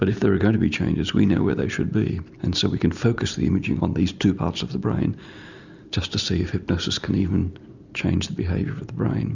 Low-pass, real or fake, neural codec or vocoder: 7.2 kHz; real; none